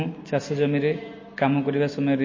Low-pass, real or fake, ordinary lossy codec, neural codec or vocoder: 7.2 kHz; real; MP3, 32 kbps; none